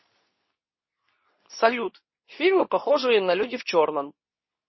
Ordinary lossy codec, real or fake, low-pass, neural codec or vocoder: MP3, 24 kbps; fake; 7.2 kHz; codec, 24 kHz, 0.9 kbps, WavTokenizer, medium speech release version 2